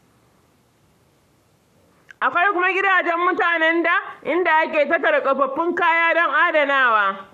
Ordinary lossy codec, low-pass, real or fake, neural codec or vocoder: none; 14.4 kHz; fake; codec, 44.1 kHz, 7.8 kbps, Pupu-Codec